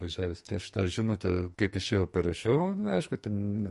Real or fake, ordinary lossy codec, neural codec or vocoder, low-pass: fake; MP3, 48 kbps; codec, 44.1 kHz, 2.6 kbps, SNAC; 14.4 kHz